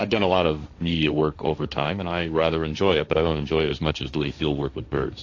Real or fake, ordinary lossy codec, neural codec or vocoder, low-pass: fake; AAC, 48 kbps; codec, 16 kHz, 1.1 kbps, Voila-Tokenizer; 7.2 kHz